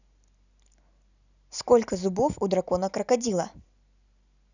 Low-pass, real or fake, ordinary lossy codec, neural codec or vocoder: 7.2 kHz; real; none; none